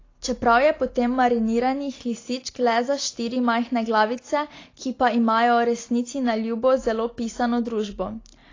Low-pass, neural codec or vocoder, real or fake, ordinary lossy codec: 7.2 kHz; none; real; AAC, 32 kbps